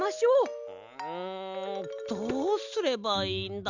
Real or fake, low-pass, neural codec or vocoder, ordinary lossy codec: real; 7.2 kHz; none; none